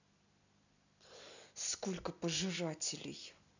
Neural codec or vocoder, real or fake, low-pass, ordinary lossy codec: none; real; 7.2 kHz; none